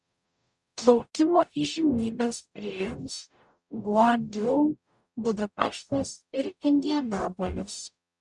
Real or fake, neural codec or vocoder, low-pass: fake; codec, 44.1 kHz, 0.9 kbps, DAC; 10.8 kHz